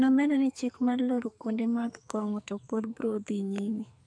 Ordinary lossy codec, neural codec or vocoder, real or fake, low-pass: none; codec, 44.1 kHz, 2.6 kbps, SNAC; fake; 9.9 kHz